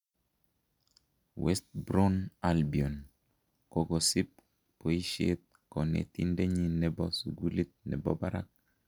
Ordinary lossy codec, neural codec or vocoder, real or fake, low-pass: none; none; real; 19.8 kHz